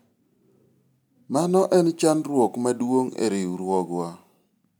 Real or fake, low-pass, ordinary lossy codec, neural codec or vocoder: real; none; none; none